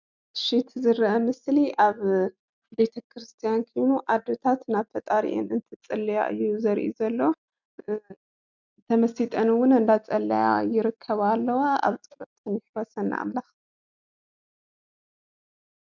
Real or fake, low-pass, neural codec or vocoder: real; 7.2 kHz; none